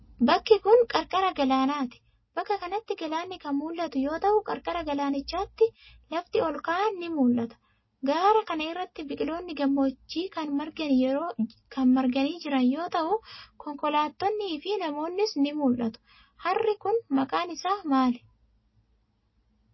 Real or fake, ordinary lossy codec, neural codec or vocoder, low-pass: real; MP3, 24 kbps; none; 7.2 kHz